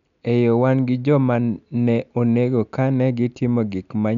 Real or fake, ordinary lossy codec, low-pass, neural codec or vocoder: real; none; 7.2 kHz; none